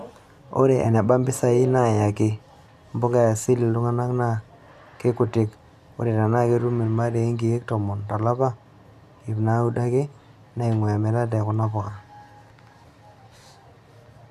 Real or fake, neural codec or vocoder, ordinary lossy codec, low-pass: real; none; none; 14.4 kHz